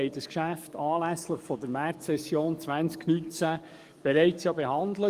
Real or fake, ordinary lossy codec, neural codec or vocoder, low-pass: fake; Opus, 32 kbps; codec, 44.1 kHz, 7.8 kbps, DAC; 14.4 kHz